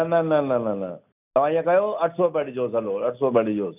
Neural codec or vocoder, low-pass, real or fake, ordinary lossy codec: none; 3.6 kHz; real; none